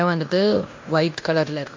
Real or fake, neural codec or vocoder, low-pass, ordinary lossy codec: fake; codec, 16 kHz in and 24 kHz out, 0.9 kbps, LongCat-Audio-Codec, fine tuned four codebook decoder; 7.2 kHz; MP3, 48 kbps